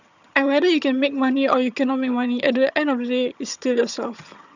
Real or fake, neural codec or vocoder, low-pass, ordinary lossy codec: fake; vocoder, 22.05 kHz, 80 mel bands, HiFi-GAN; 7.2 kHz; none